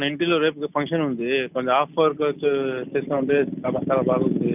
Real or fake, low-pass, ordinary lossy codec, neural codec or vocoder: real; 3.6 kHz; none; none